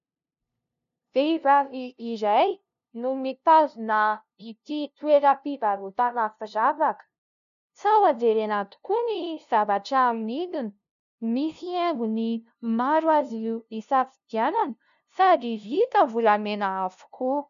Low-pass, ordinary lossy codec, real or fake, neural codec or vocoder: 7.2 kHz; AAC, 96 kbps; fake; codec, 16 kHz, 0.5 kbps, FunCodec, trained on LibriTTS, 25 frames a second